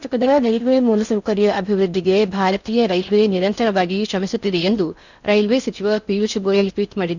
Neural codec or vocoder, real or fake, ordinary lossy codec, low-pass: codec, 16 kHz in and 24 kHz out, 0.6 kbps, FocalCodec, streaming, 4096 codes; fake; none; 7.2 kHz